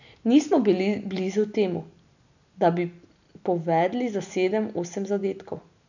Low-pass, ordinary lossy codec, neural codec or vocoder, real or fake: 7.2 kHz; none; none; real